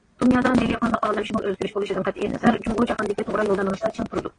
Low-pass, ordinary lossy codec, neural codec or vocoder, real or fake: 9.9 kHz; AAC, 32 kbps; vocoder, 22.05 kHz, 80 mel bands, Vocos; fake